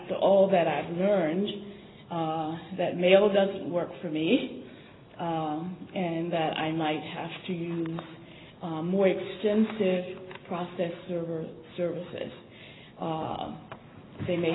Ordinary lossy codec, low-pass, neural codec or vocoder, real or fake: AAC, 16 kbps; 7.2 kHz; none; real